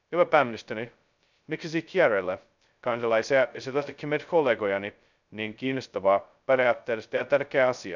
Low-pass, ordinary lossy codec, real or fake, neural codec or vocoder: 7.2 kHz; none; fake; codec, 16 kHz, 0.2 kbps, FocalCodec